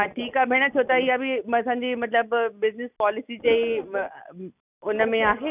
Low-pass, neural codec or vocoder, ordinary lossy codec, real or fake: 3.6 kHz; none; none; real